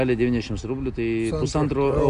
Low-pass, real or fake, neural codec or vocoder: 9.9 kHz; real; none